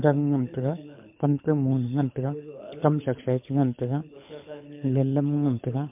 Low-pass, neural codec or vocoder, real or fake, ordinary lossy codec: 3.6 kHz; codec, 24 kHz, 6 kbps, HILCodec; fake; MP3, 32 kbps